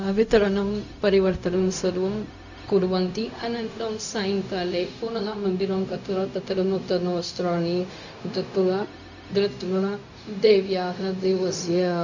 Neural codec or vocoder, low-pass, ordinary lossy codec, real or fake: codec, 16 kHz, 0.4 kbps, LongCat-Audio-Codec; 7.2 kHz; none; fake